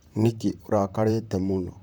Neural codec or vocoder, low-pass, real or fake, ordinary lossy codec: vocoder, 44.1 kHz, 128 mel bands, Pupu-Vocoder; none; fake; none